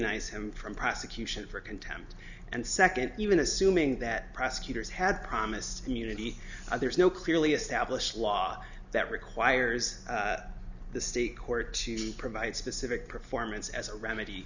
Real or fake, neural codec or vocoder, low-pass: real; none; 7.2 kHz